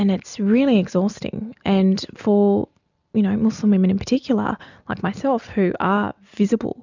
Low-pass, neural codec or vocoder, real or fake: 7.2 kHz; none; real